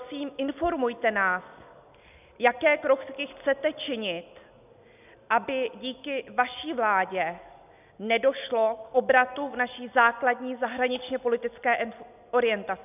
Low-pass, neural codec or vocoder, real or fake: 3.6 kHz; none; real